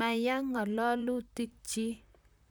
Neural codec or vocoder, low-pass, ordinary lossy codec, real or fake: vocoder, 44.1 kHz, 128 mel bands, Pupu-Vocoder; none; none; fake